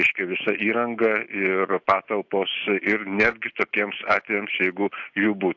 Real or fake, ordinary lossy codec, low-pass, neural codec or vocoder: real; AAC, 48 kbps; 7.2 kHz; none